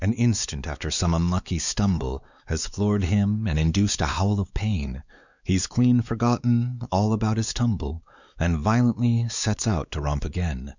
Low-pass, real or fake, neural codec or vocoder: 7.2 kHz; fake; codec, 16 kHz, 4 kbps, X-Codec, WavLM features, trained on Multilingual LibriSpeech